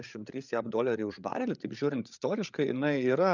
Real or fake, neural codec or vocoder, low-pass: fake; codec, 16 kHz, 8 kbps, FreqCodec, larger model; 7.2 kHz